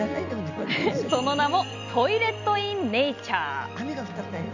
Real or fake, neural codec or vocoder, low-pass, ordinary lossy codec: real; none; 7.2 kHz; none